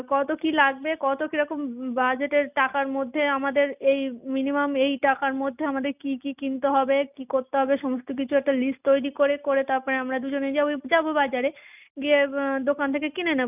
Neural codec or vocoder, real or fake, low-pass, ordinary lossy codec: none; real; 3.6 kHz; none